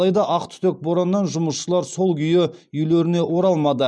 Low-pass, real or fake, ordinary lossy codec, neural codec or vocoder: none; real; none; none